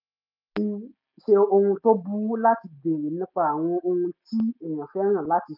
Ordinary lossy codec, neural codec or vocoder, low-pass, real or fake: none; none; 5.4 kHz; real